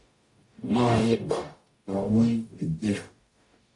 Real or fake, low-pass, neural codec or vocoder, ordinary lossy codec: fake; 10.8 kHz; codec, 44.1 kHz, 0.9 kbps, DAC; AAC, 48 kbps